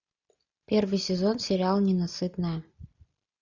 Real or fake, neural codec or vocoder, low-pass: real; none; 7.2 kHz